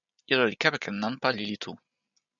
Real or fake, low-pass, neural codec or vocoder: real; 7.2 kHz; none